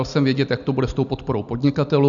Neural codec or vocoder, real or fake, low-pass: none; real; 7.2 kHz